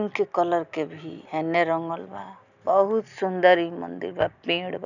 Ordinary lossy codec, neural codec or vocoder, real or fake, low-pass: none; none; real; 7.2 kHz